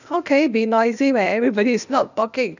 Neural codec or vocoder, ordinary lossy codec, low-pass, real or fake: codec, 16 kHz, 0.8 kbps, ZipCodec; none; 7.2 kHz; fake